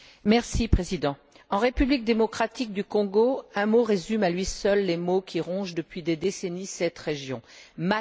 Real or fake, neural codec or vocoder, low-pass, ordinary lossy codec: real; none; none; none